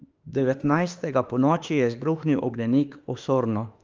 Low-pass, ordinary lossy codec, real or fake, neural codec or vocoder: 7.2 kHz; Opus, 24 kbps; fake; autoencoder, 48 kHz, 32 numbers a frame, DAC-VAE, trained on Japanese speech